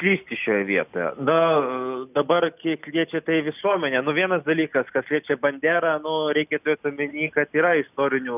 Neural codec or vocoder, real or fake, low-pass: none; real; 3.6 kHz